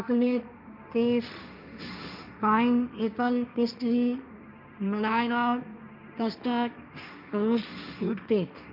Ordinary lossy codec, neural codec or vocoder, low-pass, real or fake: none; codec, 16 kHz, 1.1 kbps, Voila-Tokenizer; 5.4 kHz; fake